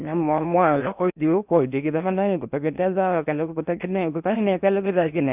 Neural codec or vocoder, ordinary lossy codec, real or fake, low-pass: codec, 16 kHz in and 24 kHz out, 0.8 kbps, FocalCodec, streaming, 65536 codes; none; fake; 3.6 kHz